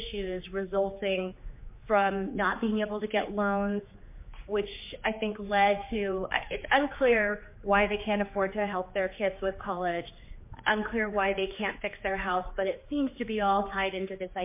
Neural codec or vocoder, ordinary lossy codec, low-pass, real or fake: codec, 16 kHz, 4 kbps, X-Codec, HuBERT features, trained on general audio; MP3, 24 kbps; 3.6 kHz; fake